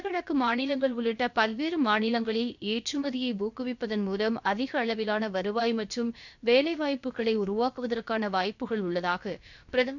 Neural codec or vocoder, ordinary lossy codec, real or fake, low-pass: codec, 16 kHz, about 1 kbps, DyCAST, with the encoder's durations; none; fake; 7.2 kHz